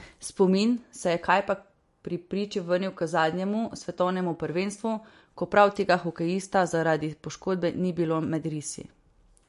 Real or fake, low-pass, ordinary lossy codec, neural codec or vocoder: real; 14.4 kHz; MP3, 48 kbps; none